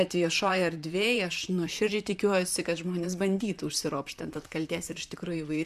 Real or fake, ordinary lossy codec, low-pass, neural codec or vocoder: fake; MP3, 96 kbps; 14.4 kHz; vocoder, 44.1 kHz, 128 mel bands, Pupu-Vocoder